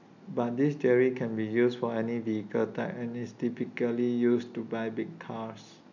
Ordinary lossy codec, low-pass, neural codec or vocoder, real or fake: none; 7.2 kHz; none; real